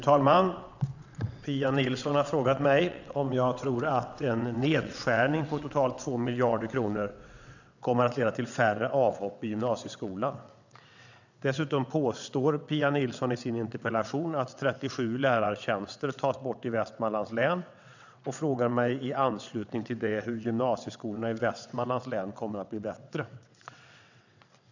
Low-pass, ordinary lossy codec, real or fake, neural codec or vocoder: 7.2 kHz; none; fake; vocoder, 22.05 kHz, 80 mel bands, WaveNeXt